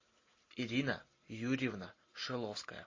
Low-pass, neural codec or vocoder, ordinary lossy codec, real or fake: 7.2 kHz; none; MP3, 32 kbps; real